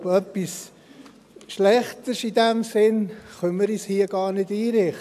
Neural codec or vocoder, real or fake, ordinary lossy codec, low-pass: none; real; none; 14.4 kHz